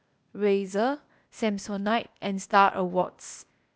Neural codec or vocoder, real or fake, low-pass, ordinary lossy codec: codec, 16 kHz, 0.8 kbps, ZipCodec; fake; none; none